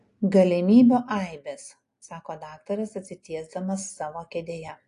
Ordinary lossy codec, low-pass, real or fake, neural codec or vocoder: AAC, 48 kbps; 10.8 kHz; real; none